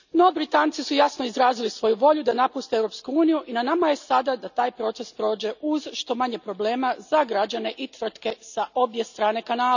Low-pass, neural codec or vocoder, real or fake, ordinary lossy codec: 7.2 kHz; none; real; none